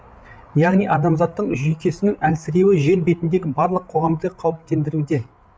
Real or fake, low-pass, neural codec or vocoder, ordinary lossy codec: fake; none; codec, 16 kHz, 8 kbps, FreqCodec, larger model; none